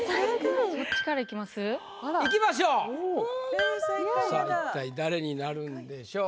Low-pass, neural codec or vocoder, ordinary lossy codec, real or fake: none; none; none; real